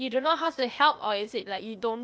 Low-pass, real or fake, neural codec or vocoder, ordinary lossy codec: none; fake; codec, 16 kHz, 0.8 kbps, ZipCodec; none